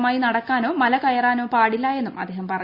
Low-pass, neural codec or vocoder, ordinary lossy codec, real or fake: 5.4 kHz; none; Opus, 64 kbps; real